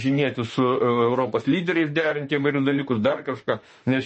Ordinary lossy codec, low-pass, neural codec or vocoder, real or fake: MP3, 32 kbps; 10.8 kHz; autoencoder, 48 kHz, 32 numbers a frame, DAC-VAE, trained on Japanese speech; fake